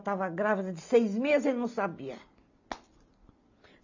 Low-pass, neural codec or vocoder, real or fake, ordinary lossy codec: 7.2 kHz; none; real; AAC, 48 kbps